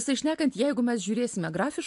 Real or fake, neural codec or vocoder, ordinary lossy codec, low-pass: real; none; AAC, 64 kbps; 10.8 kHz